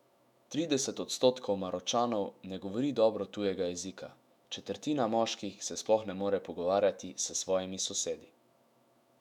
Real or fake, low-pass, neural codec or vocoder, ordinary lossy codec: fake; 19.8 kHz; autoencoder, 48 kHz, 128 numbers a frame, DAC-VAE, trained on Japanese speech; none